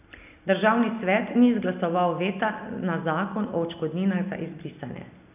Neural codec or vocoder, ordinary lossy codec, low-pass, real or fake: none; none; 3.6 kHz; real